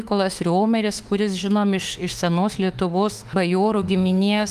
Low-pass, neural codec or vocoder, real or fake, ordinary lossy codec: 14.4 kHz; autoencoder, 48 kHz, 32 numbers a frame, DAC-VAE, trained on Japanese speech; fake; Opus, 24 kbps